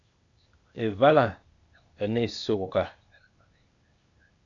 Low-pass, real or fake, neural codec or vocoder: 7.2 kHz; fake; codec, 16 kHz, 0.8 kbps, ZipCodec